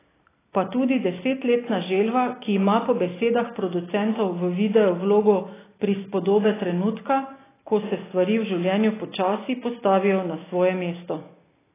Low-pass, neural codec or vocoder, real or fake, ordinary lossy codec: 3.6 kHz; none; real; AAC, 16 kbps